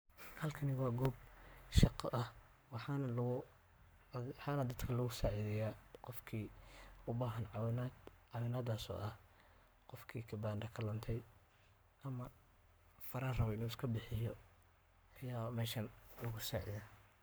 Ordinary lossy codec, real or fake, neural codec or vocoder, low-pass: none; fake; codec, 44.1 kHz, 7.8 kbps, Pupu-Codec; none